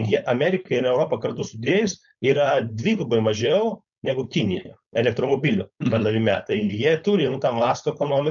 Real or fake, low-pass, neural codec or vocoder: fake; 7.2 kHz; codec, 16 kHz, 4.8 kbps, FACodec